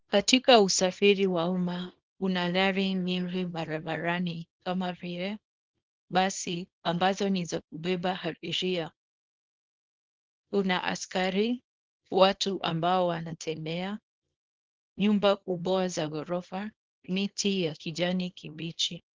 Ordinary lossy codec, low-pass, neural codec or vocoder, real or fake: Opus, 16 kbps; 7.2 kHz; codec, 24 kHz, 0.9 kbps, WavTokenizer, small release; fake